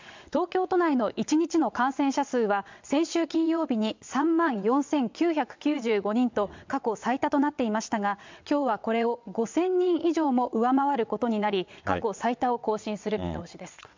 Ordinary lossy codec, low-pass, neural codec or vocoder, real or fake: MP3, 64 kbps; 7.2 kHz; vocoder, 22.05 kHz, 80 mel bands, WaveNeXt; fake